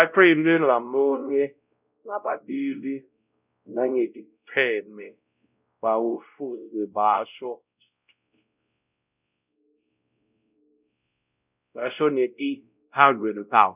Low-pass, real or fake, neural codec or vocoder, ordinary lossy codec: 3.6 kHz; fake; codec, 16 kHz, 0.5 kbps, X-Codec, WavLM features, trained on Multilingual LibriSpeech; none